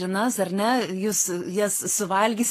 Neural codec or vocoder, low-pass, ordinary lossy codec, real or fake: codec, 44.1 kHz, 7.8 kbps, Pupu-Codec; 14.4 kHz; AAC, 48 kbps; fake